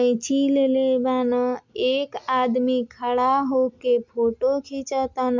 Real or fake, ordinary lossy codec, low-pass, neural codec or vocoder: real; MP3, 64 kbps; 7.2 kHz; none